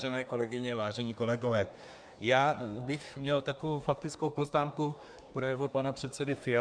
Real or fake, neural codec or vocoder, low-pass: fake; codec, 24 kHz, 1 kbps, SNAC; 9.9 kHz